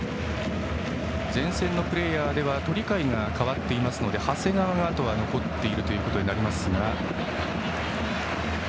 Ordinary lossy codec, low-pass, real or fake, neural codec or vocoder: none; none; real; none